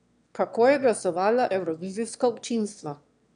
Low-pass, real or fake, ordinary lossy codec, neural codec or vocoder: 9.9 kHz; fake; none; autoencoder, 22.05 kHz, a latent of 192 numbers a frame, VITS, trained on one speaker